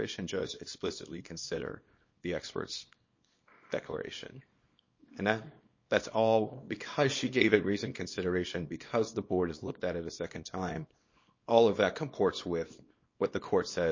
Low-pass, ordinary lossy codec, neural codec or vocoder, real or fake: 7.2 kHz; MP3, 32 kbps; codec, 24 kHz, 0.9 kbps, WavTokenizer, small release; fake